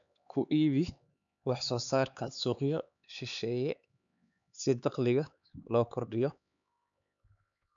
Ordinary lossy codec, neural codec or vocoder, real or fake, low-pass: none; codec, 16 kHz, 4 kbps, X-Codec, HuBERT features, trained on LibriSpeech; fake; 7.2 kHz